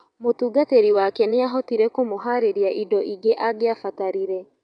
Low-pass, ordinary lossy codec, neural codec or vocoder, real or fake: 9.9 kHz; none; vocoder, 22.05 kHz, 80 mel bands, WaveNeXt; fake